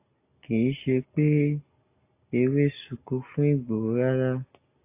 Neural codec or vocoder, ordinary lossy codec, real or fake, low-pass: none; AAC, 24 kbps; real; 3.6 kHz